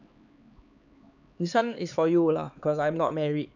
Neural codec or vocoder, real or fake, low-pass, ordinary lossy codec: codec, 16 kHz, 4 kbps, X-Codec, HuBERT features, trained on LibriSpeech; fake; 7.2 kHz; none